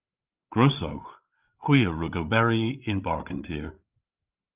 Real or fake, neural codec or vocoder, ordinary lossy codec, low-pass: fake; codec, 16 kHz, 8 kbps, FreqCodec, larger model; Opus, 24 kbps; 3.6 kHz